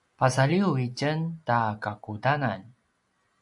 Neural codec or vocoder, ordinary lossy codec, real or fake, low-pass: none; MP3, 96 kbps; real; 10.8 kHz